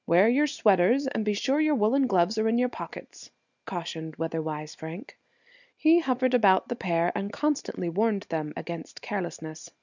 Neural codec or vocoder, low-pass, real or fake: none; 7.2 kHz; real